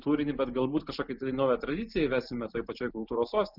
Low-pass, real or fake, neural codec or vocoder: 5.4 kHz; real; none